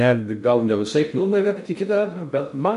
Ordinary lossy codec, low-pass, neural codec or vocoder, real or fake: MP3, 96 kbps; 10.8 kHz; codec, 16 kHz in and 24 kHz out, 0.6 kbps, FocalCodec, streaming, 2048 codes; fake